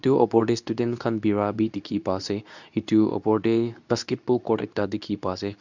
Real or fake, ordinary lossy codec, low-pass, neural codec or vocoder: fake; none; 7.2 kHz; codec, 24 kHz, 0.9 kbps, WavTokenizer, medium speech release version 2